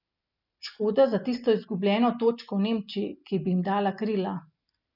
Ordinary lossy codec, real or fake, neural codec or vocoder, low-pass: none; real; none; 5.4 kHz